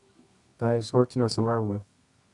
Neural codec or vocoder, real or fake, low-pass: codec, 24 kHz, 0.9 kbps, WavTokenizer, medium music audio release; fake; 10.8 kHz